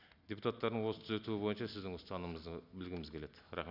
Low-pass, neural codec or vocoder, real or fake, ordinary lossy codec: 5.4 kHz; none; real; none